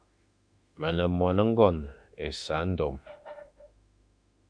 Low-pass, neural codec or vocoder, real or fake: 9.9 kHz; autoencoder, 48 kHz, 32 numbers a frame, DAC-VAE, trained on Japanese speech; fake